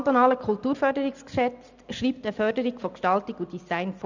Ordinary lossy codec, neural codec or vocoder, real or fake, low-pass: none; none; real; 7.2 kHz